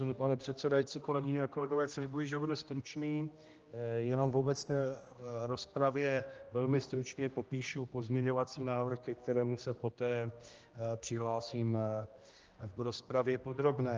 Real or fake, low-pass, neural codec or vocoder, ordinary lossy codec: fake; 7.2 kHz; codec, 16 kHz, 1 kbps, X-Codec, HuBERT features, trained on general audio; Opus, 24 kbps